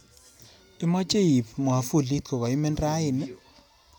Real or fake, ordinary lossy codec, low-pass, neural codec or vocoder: real; none; none; none